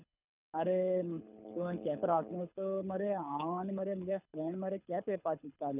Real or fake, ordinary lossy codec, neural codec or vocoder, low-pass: fake; none; codec, 24 kHz, 6 kbps, HILCodec; 3.6 kHz